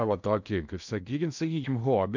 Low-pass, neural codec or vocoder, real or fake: 7.2 kHz; codec, 16 kHz in and 24 kHz out, 0.6 kbps, FocalCodec, streaming, 2048 codes; fake